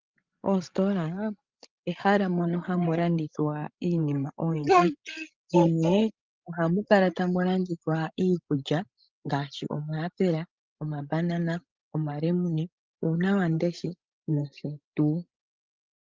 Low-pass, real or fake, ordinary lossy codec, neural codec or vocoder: 7.2 kHz; fake; Opus, 32 kbps; codec, 16 kHz, 16 kbps, FreqCodec, larger model